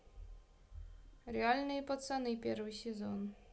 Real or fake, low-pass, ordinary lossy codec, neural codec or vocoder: real; none; none; none